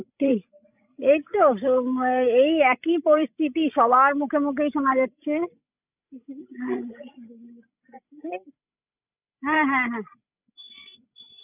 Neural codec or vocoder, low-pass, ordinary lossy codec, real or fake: codec, 16 kHz, 16 kbps, FreqCodec, larger model; 3.6 kHz; none; fake